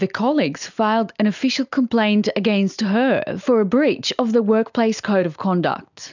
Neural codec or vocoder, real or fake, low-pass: none; real; 7.2 kHz